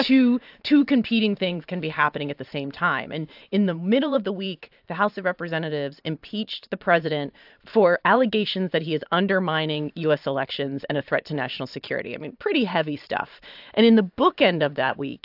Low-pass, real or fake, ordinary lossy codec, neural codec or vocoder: 5.4 kHz; fake; AAC, 48 kbps; vocoder, 44.1 kHz, 128 mel bands every 512 samples, BigVGAN v2